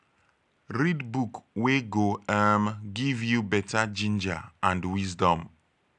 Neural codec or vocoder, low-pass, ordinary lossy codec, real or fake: none; 10.8 kHz; none; real